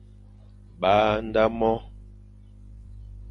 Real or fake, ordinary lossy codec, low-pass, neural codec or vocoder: fake; AAC, 48 kbps; 10.8 kHz; vocoder, 44.1 kHz, 128 mel bands every 512 samples, BigVGAN v2